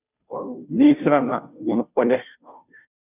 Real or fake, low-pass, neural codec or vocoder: fake; 3.6 kHz; codec, 16 kHz, 0.5 kbps, FunCodec, trained on Chinese and English, 25 frames a second